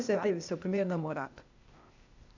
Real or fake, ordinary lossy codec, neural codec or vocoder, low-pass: fake; none; codec, 16 kHz, 0.8 kbps, ZipCodec; 7.2 kHz